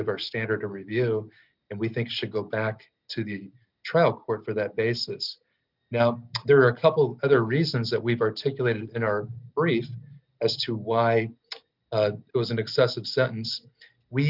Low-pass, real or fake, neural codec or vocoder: 5.4 kHz; real; none